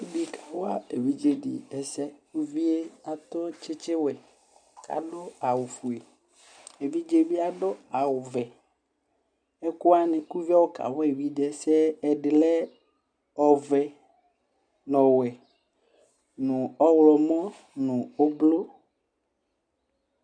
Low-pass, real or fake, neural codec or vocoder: 9.9 kHz; real; none